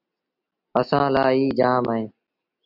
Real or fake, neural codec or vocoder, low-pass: real; none; 5.4 kHz